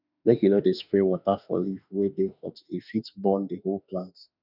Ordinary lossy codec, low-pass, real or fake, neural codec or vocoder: none; 5.4 kHz; fake; autoencoder, 48 kHz, 32 numbers a frame, DAC-VAE, trained on Japanese speech